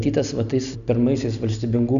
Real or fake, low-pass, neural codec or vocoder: real; 7.2 kHz; none